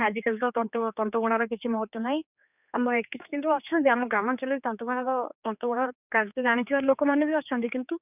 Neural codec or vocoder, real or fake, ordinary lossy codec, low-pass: codec, 16 kHz, 4 kbps, X-Codec, HuBERT features, trained on general audio; fake; none; 3.6 kHz